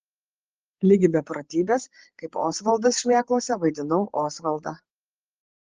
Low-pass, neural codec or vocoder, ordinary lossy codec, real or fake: 7.2 kHz; codec, 16 kHz, 8 kbps, FreqCodec, larger model; Opus, 16 kbps; fake